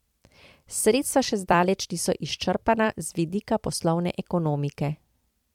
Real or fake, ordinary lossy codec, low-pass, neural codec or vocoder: fake; MP3, 96 kbps; 19.8 kHz; vocoder, 44.1 kHz, 128 mel bands every 256 samples, BigVGAN v2